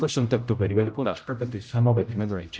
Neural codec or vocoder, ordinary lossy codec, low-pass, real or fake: codec, 16 kHz, 0.5 kbps, X-Codec, HuBERT features, trained on general audio; none; none; fake